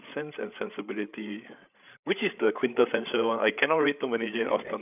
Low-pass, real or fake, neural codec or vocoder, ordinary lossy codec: 3.6 kHz; fake; codec, 16 kHz, 8 kbps, FreqCodec, larger model; none